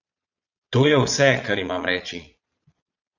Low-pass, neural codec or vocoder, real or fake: 7.2 kHz; vocoder, 22.05 kHz, 80 mel bands, Vocos; fake